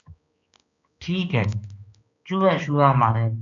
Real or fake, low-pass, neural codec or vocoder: fake; 7.2 kHz; codec, 16 kHz, 2 kbps, X-Codec, HuBERT features, trained on balanced general audio